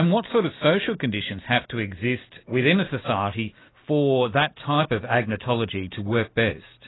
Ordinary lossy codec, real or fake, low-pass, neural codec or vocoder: AAC, 16 kbps; real; 7.2 kHz; none